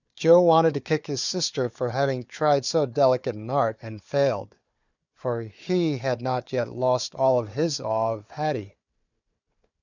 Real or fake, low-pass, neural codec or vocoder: fake; 7.2 kHz; codec, 16 kHz, 4 kbps, FunCodec, trained on Chinese and English, 50 frames a second